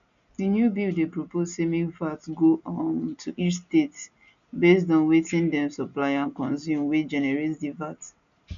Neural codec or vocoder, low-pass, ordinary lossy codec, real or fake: none; 7.2 kHz; none; real